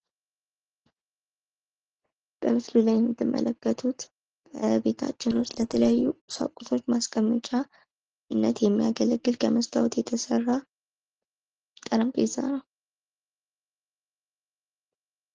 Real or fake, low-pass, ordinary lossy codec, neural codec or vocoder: real; 7.2 kHz; Opus, 16 kbps; none